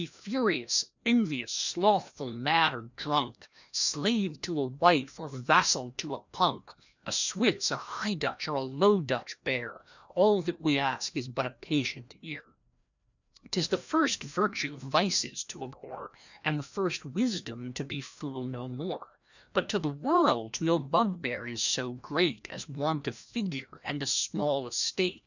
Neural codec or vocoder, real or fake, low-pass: codec, 16 kHz, 1 kbps, FreqCodec, larger model; fake; 7.2 kHz